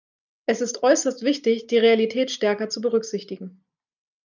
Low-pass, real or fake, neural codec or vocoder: 7.2 kHz; real; none